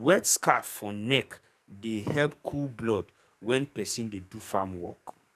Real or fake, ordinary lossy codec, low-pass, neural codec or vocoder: fake; none; 14.4 kHz; codec, 44.1 kHz, 2.6 kbps, SNAC